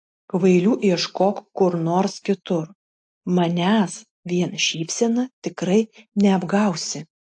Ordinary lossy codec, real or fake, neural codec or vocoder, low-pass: AAC, 64 kbps; real; none; 9.9 kHz